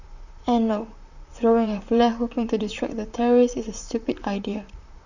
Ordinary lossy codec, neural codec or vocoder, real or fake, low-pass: none; vocoder, 44.1 kHz, 128 mel bands, Pupu-Vocoder; fake; 7.2 kHz